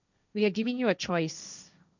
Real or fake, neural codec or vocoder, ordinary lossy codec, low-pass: fake; codec, 16 kHz, 1.1 kbps, Voila-Tokenizer; none; 7.2 kHz